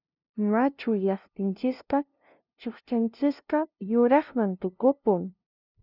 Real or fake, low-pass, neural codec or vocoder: fake; 5.4 kHz; codec, 16 kHz, 0.5 kbps, FunCodec, trained on LibriTTS, 25 frames a second